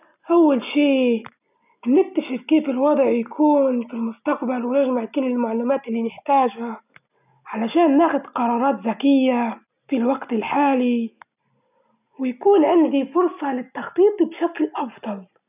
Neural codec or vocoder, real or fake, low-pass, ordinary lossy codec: none; real; 3.6 kHz; none